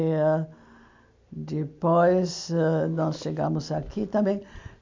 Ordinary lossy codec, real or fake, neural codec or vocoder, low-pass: MP3, 48 kbps; real; none; 7.2 kHz